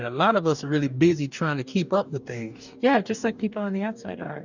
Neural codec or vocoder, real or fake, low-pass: codec, 44.1 kHz, 2.6 kbps, DAC; fake; 7.2 kHz